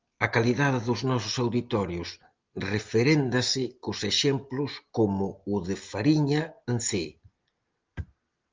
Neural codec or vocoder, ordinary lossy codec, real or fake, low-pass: none; Opus, 32 kbps; real; 7.2 kHz